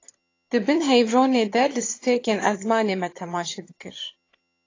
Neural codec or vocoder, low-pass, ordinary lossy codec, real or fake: vocoder, 22.05 kHz, 80 mel bands, HiFi-GAN; 7.2 kHz; AAC, 32 kbps; fake